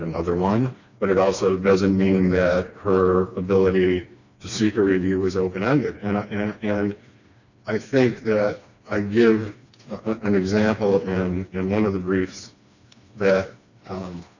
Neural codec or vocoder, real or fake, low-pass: codec, 16 kHz, 2 kbps, FreqCodec, smaller model; fake; 7.2 kHz